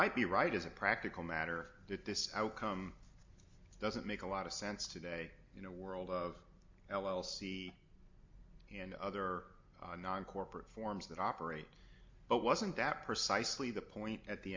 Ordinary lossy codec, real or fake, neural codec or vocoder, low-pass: MP3, 48 kbps; real; none; 7.2 kHz